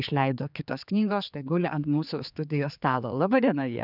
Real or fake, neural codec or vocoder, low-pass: fake; codec, 16 kHz, 4 kbps, X-Codec, HuBERT features, trained on general audio; 5.4 kHz